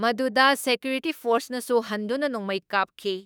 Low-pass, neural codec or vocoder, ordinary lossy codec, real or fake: none; autoencoder, 48 kHz, 32 numbers a frame, DAC-VAE, trained on Japanese speech; none; fake